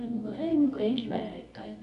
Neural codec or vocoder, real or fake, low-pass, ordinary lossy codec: codec, 24 kHz, 0.9 kbps, WavTokenizer, medium music audio release; fake; 10.8 kHz; none